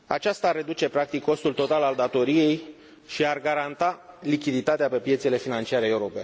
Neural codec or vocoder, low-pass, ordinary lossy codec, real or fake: none; none; none; real